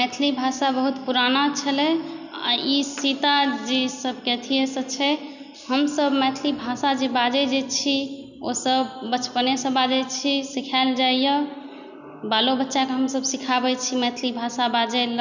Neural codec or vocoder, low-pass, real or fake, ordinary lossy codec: none; 7.2 kHz; real; none